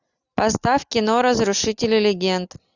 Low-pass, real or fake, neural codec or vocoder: 7.2 kHz; real; none